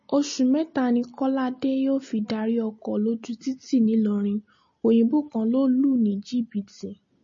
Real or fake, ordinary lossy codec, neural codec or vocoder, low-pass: real; MP3, 32 kbps; none; 7.2 kHz